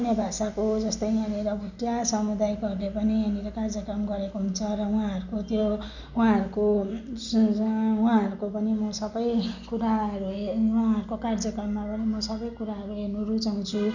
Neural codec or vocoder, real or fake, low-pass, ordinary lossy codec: none; real; 7.2 kHz; none